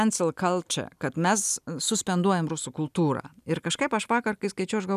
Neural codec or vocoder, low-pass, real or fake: none; 14.4 kHz; real